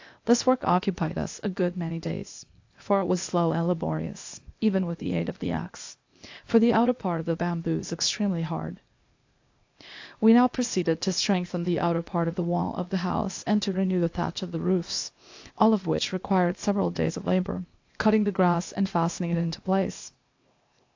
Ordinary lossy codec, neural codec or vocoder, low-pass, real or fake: AAC, 48 kbps; codec, 16 kHz, 0.8 kbps, ZipCodec; 7.2 kHz; fake